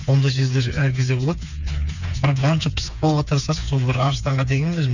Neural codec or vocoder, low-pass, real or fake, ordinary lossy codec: codec, 16 kHz, 4 kbps, FreqCodec, smaller model; 7.2 kHz; fake; none